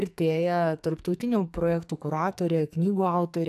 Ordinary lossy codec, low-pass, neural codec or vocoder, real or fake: AAC, 96 kbps; 14.4 kHz; codec, 44.1 kHz, 2.6 kbps, SNAC; fake